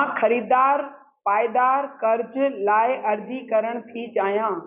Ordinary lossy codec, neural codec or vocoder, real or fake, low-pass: none; none; real; 3.6 kHz